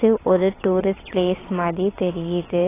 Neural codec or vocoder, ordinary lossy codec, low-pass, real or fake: vocoder, 22.05 kHz, 80 mel bands, WaveNeXt; AAC, 16 kbps; 3.6 kHz; fake